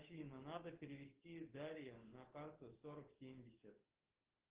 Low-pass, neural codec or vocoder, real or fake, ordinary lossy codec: 3.6 kHz; codec, 16 kHz, 6 kbps, DAC; fake; Opus, 16 kbps